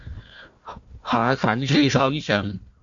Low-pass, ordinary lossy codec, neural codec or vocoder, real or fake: 7.2 kHz; MP3, 48 kbps; codec, 16 kHz, 1 kbps, FunCodec, trained on Chinese and English, 50 frames a second; fake